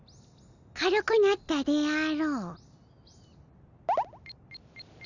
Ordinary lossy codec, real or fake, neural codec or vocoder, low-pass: none; real; none; 7.2 kHz